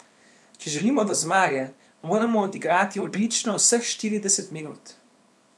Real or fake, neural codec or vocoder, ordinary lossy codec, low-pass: fake; codec, 24 kHz, 0.9 kbps, WavTokenizer, small release; none; none